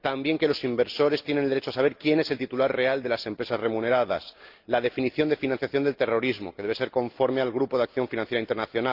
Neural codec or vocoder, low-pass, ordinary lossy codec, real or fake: none; 5.4 kHz; Opus, 32 kbps; real